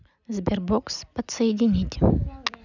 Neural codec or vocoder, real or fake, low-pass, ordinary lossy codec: none; real; 7.2 kHz; none